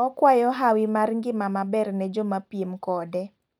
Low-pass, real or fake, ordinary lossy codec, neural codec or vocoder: 19.8 kHz; real; none; none